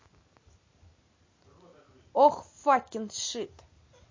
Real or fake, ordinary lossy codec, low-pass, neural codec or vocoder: real; MP3, 32 kbps; 7.2 kHz; none